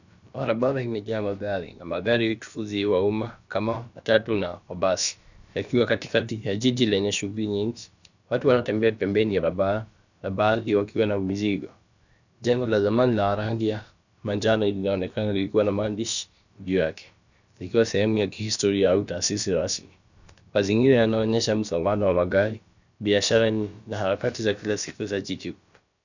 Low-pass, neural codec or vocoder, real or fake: 7.2 kHz; codec, 16 kHz, about 1 kbps, DyCAST, with the encoder's durations; fake